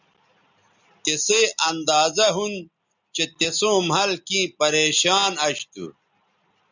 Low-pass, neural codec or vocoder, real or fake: 7.2 kHz; none; real